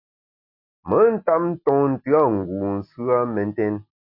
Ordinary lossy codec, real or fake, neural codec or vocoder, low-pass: MP3, 32 kbps; real; none; 5.4 kHz